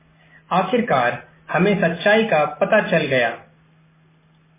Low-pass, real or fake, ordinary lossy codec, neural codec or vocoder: 3.6 kHz; real; MP3, 16 kbps; none